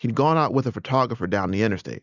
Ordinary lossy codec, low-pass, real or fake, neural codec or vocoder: Opus, 64 kbps; 7.2 kHz; real; none